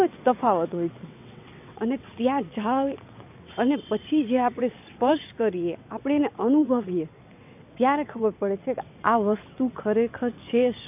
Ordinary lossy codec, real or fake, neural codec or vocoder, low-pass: AAC, 32 kbps; fake; vocoder, 22.05 kHz, 80 mel bands, WaveNeXt; 3.6 kHz